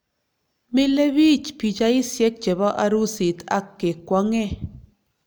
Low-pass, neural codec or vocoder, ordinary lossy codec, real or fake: none; none; none; real